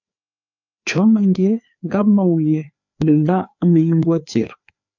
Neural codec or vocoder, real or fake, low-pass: codec, 16 kHz, 2 kbps, FreqCodec, larger model; fake; 7.2 kHz